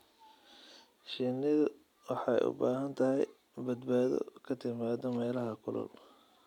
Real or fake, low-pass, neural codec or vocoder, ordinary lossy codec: real; 19.8 kHz; none; none